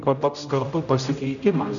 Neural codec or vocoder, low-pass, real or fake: codec, 16 kHz, 0.5 kbps, X-Codec, HuBERT features, trained on general audio; 7.2 kHz; fake